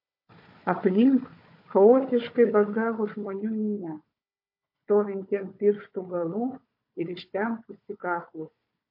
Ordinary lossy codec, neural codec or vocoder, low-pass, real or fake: MP3, 32 kbps; codec, 16 kHz, 4 kbps, FunCodec, trained on Chinese and English, 50 frames a second; 5.4 kHz; fake